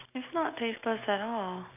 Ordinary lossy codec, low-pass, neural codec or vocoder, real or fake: none; 3.6 kHz; none; real